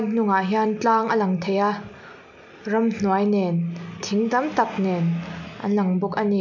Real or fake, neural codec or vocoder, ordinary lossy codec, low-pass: real; none; none; 7.2 kHz